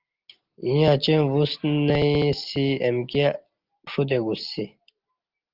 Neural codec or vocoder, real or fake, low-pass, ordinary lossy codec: none; real; 5.4 kHz; Opus, 32 kbps